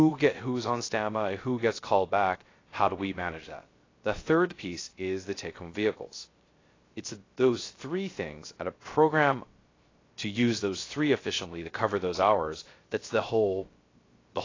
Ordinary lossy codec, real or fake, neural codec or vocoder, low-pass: AAC, 32 kbps; fake; codec, 16 kHz, 0.3 kbps, FocalCodec; 7.2 kHz